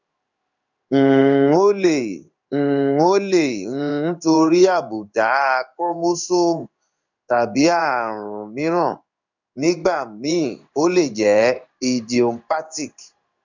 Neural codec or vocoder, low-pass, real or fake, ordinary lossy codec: codec, 16 kHz in and 24 kHz out, 1 kbps, XY-Tokenizer; 7.2 kHz; fake; none